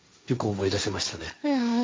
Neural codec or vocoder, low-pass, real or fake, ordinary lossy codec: codec, 16 kHz, 1.1 kbps, Voila-Tokenizer; none; fake; none